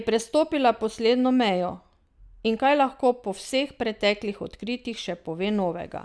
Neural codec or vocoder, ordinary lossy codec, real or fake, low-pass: none; none; real; none